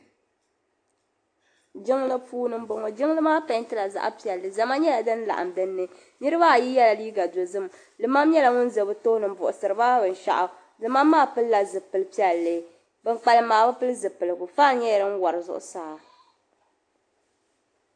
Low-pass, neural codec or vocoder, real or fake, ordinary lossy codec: 9.9 kHz; none; real; AAC, 48 kbps